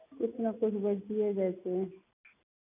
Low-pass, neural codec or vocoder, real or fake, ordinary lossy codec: 3.6 kHz; none; real; none